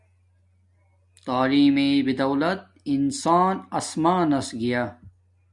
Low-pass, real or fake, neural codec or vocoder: 10.8 kHz; real; none